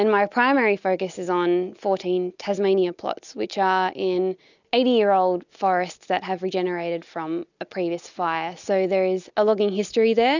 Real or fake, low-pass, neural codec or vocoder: real; 7.2 kHz; none